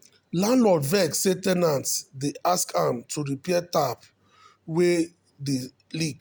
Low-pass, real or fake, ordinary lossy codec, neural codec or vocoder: none; real; none; none